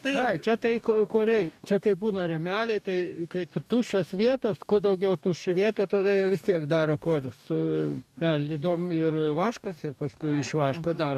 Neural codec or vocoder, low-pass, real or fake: codec, 44.1 kHz, 2.6 kbps, DAC; 14.4 kHz; fake